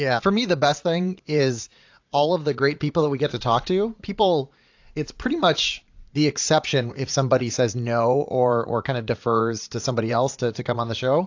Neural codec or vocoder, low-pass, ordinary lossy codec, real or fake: vocoder, 44.1 kHz, 80 mel bands, Vocos; 7.2 kHz; AAC, 48 kbps; fake